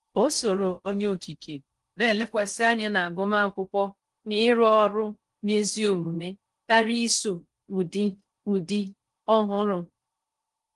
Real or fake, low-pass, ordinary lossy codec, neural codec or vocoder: fake; 10.8 kHz; Opus, 24 kbps; codec, 16 kHz in and 24 kHz out, 0.6 kbps, FocalCodec, streaming, 4096 codes